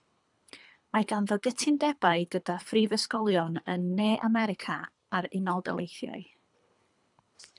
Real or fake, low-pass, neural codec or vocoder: fake; 10.8 kHz; codec, 24 kHz, 3 kbps, HILCodec